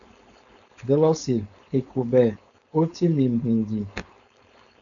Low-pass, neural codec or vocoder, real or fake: 7.2 kHz; codec, 16 kHz, 4.8 kbps, FACodec; fake